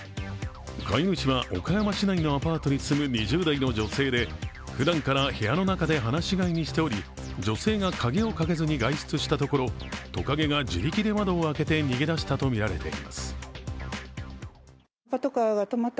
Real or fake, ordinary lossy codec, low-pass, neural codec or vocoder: real; none; none; none